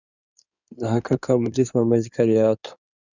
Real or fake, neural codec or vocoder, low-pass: fake; codec, 16 kHz in and 24 kHz out, 2.2 kbps, FireRedTTS-2 codec; 7.2 kHz